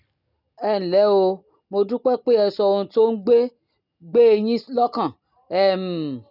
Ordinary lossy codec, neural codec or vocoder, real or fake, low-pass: none; none; real; 5.4 kHz